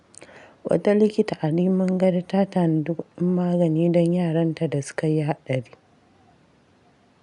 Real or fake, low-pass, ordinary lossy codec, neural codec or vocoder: real; 10.8 kHz; none; none